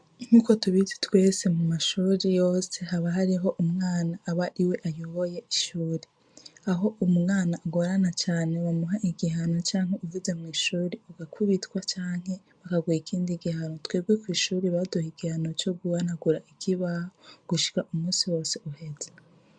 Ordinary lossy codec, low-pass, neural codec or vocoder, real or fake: MP3, 64 kbps; 9.9 kHz; none; real